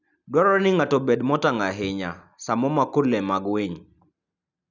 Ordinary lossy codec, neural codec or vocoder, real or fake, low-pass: none; none; real; 7.2 kHz